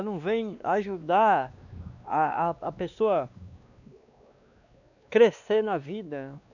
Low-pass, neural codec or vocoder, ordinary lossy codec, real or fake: 7.2 kHz; codec, 16 kHz, 2 kbps, X-Codec, WavLM features, trained on Multilingual LibriSpeech; none; fake